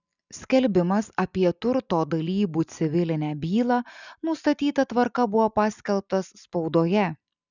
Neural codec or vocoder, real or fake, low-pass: none; real; 7.2 kHz